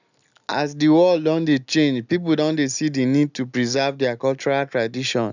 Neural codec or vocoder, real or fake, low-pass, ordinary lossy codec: none; real; 7.2 kHz; none